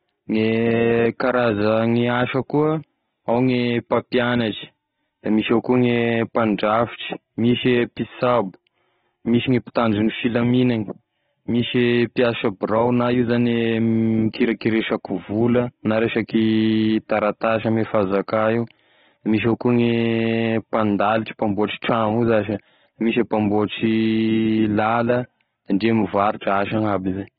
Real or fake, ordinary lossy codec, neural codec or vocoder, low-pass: real; AAC, 16 kbps; none; 7.2 kHz